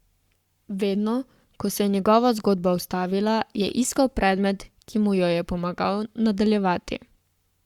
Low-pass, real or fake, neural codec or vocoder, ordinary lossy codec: 19.8 kHz; fake; codec, 44.1 kHz, 7.8 kbps, Pupu-Codec; Opus, 64 kbps